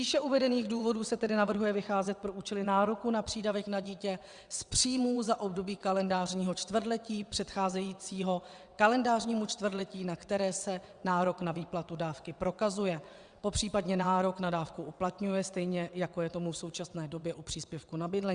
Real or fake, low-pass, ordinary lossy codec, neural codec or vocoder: fake; 9.9 kHz; Opus, 64 kbps; vocoder, 22.05 kHz, 80 mel bands, WaveNeXt